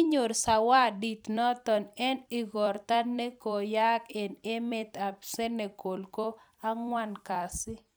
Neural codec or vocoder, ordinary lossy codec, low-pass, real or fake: vocoder, 44.1 kHz, 128 mel bands every 256 samples, BigVGAN v2; none; none; fake